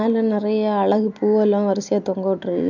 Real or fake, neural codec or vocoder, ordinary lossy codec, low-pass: real; none; none; 7.2 kHz